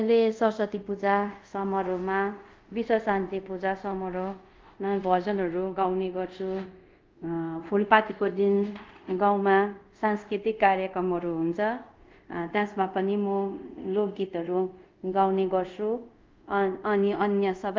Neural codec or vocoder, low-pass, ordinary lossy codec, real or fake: codec, 24 kHz, 0.5 kbps, DualCodec; 7.2 kHz; Opus, 32 kbps; fake